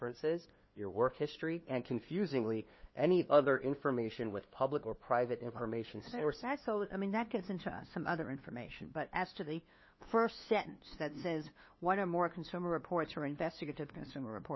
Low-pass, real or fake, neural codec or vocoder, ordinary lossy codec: 7.2 kHz; fake; codec, 16 kHz, 2 kbps, FunCodec, trained on LibriTTS, 25 frames a second; MP3, 24 kbps